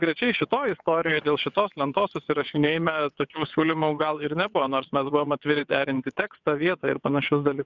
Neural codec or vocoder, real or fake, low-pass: vocoder, 22.05 kHz, 80 mel bands, WaveNeXt; fake; 7.2 kHz